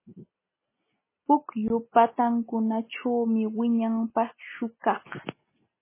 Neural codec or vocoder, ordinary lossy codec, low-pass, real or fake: none; MP3, 16 kbps; 3.6 kHz; real